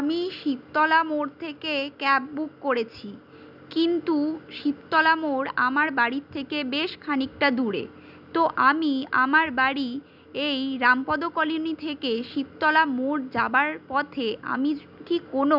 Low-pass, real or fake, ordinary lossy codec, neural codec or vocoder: 5.4 kHz; real; none; none